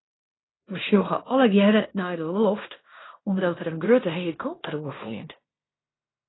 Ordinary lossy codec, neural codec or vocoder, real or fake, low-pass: AAC, 16 kbps; codec, 16 kHz in and 24 kHz out, 0.9 kbps, LongCat-Audio-Codec, fine tuned four codebook decoder; fake; 7.2 kHz